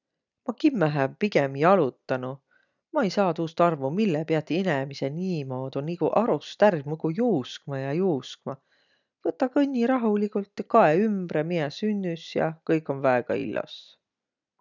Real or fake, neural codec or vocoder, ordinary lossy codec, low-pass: real; none; none; 7.2 kHz